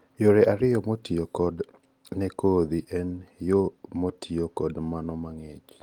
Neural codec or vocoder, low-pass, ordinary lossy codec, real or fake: none; 19.8 kHz; Opus, 24 kbps; real